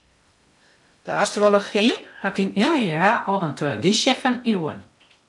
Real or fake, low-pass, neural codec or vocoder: fake; 10.8 kHz; codec, 16 kHz in and 24 kHz out, 0.6 kbps, FocalCodec, streaming, 2048 codes